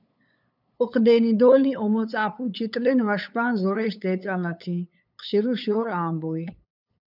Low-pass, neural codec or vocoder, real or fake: 5.4 kHz; codec, 16 kHz, 8 kbps, FunCodec, trained on LibriTTS, 25 frames a second; fake